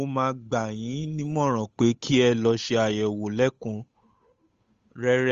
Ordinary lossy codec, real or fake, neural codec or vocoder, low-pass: Opus, 32 kbps; fake; codec, 16 kHz, 8 kbps, FunCodec, trained on Chinese and English, 25 frames a second; 7.2 kHz